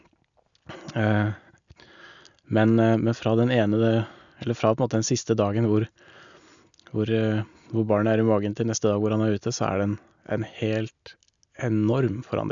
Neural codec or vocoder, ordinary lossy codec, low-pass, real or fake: none; none; 7.2 kHz; real